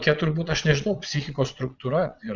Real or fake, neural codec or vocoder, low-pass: fake; vocoder, 22.05 kHz, 80 mel bands, Vocos; 7.2 kHz